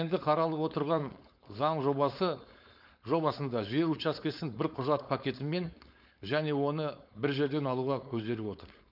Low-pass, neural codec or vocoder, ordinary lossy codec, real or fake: 5.4 kHz; codec, 16 kHz, 4.8 kbps, FACodec; none; fake